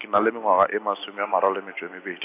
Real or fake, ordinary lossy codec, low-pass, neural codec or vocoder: real; AAC, 24 kbps; 3.6 kHz; none